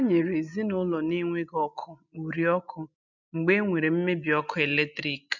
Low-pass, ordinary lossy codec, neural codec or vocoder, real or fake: 7.2 kHz; none; none; real